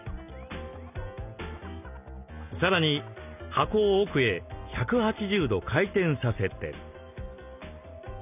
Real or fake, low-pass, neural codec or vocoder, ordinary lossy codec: real; 3.6 kHz; none; none